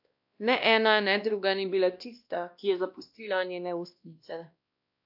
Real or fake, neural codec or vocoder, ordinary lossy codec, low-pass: fake; codec, 16 kHz, 1 kbps, X-Codec, WavLM features, trained on Multilingual LibriSpeech; AAC, 48 kbps; 5.4 kHz